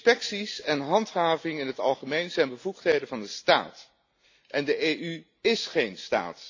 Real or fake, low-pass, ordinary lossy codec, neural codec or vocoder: real; 7.2 kHz; AAC, 48 kbps; none